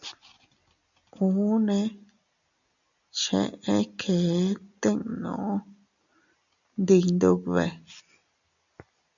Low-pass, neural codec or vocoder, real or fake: 7.2 kHz; none; real